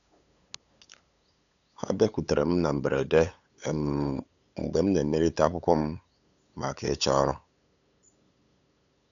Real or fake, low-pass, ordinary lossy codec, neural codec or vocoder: fake; 7.2 kHz; none; codec, 16 kHz, 8 kbps, FunCodec, trained on LibriTTS, 25 frames a second